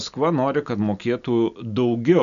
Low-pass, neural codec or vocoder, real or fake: 7.2 kHz; none; real